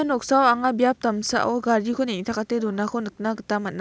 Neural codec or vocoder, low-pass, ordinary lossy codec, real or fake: none; none; none; real